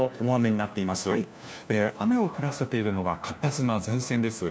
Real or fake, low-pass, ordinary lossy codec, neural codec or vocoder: fake; none; none; codec, 16 kHz, 1 kbps, FunCodec, trained on LibriTTS, 50 frames a second